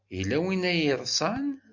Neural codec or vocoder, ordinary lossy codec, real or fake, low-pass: none; MP3, 64 kbps; real; 7.2 kHz